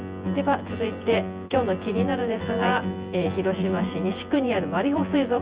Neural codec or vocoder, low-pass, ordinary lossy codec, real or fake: vocoder, 24 kHz, 100 mel bands, Vocos; 3.6 kHz; Opus, 24 kbps; fake